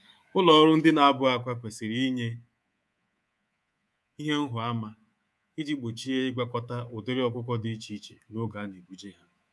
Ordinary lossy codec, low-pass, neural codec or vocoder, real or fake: none; none; codec, 24 kHz, 3.1 kbps, DualCodec; fake